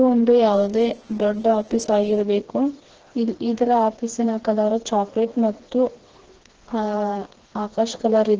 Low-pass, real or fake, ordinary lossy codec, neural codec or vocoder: 7.2 kHz; fake; Opus, 16 kbps; codec, 16 kHz, 2 kbps, FreqCodec, smaller model